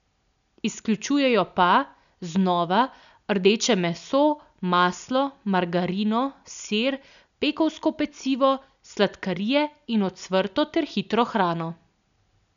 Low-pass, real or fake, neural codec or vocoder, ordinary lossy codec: 7.2 kHz; real; none; none